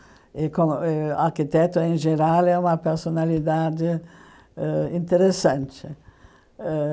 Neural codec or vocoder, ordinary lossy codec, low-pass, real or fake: none; none; none; real